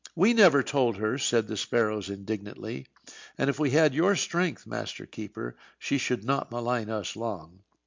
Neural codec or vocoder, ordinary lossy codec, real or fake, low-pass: none; MP3, 64 kbps; real; 7.2 kHz